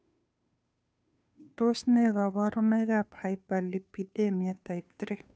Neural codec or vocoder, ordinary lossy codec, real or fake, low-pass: codec, 16 kHz, 2 kbps, FunCodec, trained on Chinese and English, 25 frames a second; none; fake; none